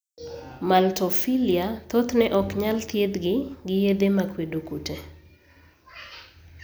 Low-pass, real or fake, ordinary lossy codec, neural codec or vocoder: none; real; none; none